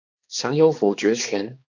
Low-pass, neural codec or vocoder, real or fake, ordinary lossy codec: 7.2 kHz; codec, 16 kHz, 2 kbps, X-Codec, HuBERT features, trained on balanced general audio; fake; AAC, 48 kbps